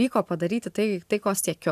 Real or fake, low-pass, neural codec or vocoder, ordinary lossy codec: real; 14.4 kHz; none; AAC, 96 kbps